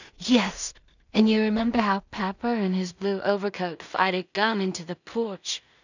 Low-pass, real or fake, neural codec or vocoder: 7.2 kHz; fake; codec, 16 kHz in and 24 kHz out, 0.4 kbps, LongCat-Audio-Codec, two codebook decoder